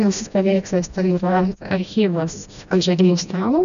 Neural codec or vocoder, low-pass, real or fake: codec, 16 kHz, 1 kbps, FreqCodec, smaller model; 7.2 kHz; fake